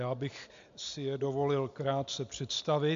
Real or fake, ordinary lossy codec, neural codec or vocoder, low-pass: real; AAC, 64 kbps; none; 7.2 kHz